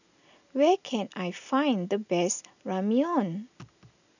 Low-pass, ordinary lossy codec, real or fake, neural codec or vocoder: 7.2 kHz; none; real; none